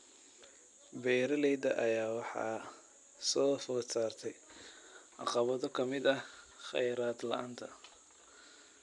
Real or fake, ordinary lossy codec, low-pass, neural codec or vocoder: real; none; 10.8 kHz; none